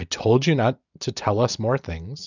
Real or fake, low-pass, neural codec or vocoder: real; 7.2 kHz; none